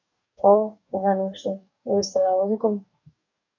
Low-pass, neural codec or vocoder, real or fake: 7.2 kHz; codec, 44.1 kHz, 2.6 kbps, DAC; fake